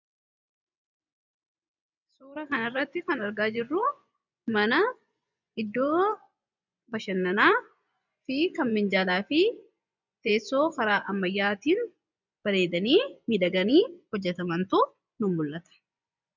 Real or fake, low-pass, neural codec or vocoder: fake; 7.2 kHz; vocoder, 24 kHz, 100 mel bands, Vocos